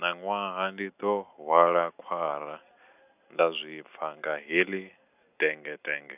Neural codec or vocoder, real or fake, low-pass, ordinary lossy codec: none; real; 3.6 kHz; none